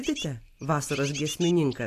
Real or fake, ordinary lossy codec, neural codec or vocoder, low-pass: real; AAC, 64 kbps; none; 14.4 kHz